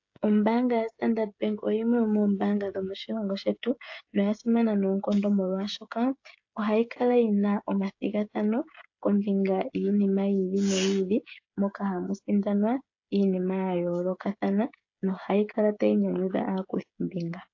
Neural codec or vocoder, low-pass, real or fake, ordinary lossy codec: codec, 16 kHz, 16 kbps, FreqCodec, smaller model; 7.2 kHz; fake; AAC, 48 kbps